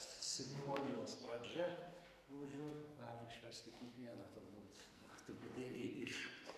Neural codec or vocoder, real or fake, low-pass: codec, 44.1 kHz, 2.6 kbps, SNAC; fake; 14.4 kHz